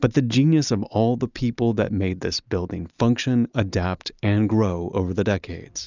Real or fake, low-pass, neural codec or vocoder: real; 7.2 kHz; none